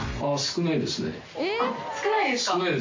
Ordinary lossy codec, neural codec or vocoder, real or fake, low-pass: MP3, 48 kbps; none; real; 7.2 kHz